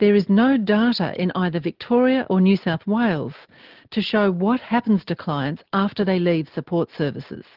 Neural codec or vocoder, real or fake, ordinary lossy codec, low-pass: none; real; Opus, 16 kbps; 5.4 kHz